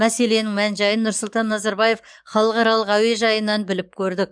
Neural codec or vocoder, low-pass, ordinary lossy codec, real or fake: codec, 44.1 kHz, 7.8 kbps, DAC; 9.9 kHz; none; fake